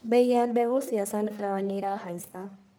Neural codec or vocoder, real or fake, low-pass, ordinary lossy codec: codec, 44.1 kHz, 1.7 kbps, Pupu-Codec; fake; none; none